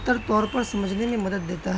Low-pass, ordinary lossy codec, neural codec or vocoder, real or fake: none; none; none; real